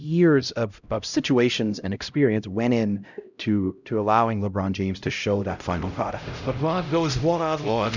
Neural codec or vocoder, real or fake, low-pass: codec, 16 kHz, 0.5 kbps, X-Codec, HuBERT features, trained on LibriSpeech; fake; 7.2 kHz